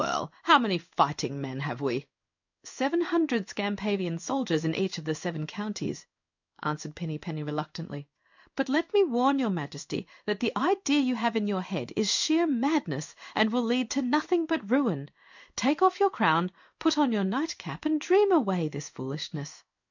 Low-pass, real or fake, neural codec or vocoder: 7.2 kHz; fake; codec, 16 kHz in and 24 kHz out, 1 kbps, XY-Tokenizer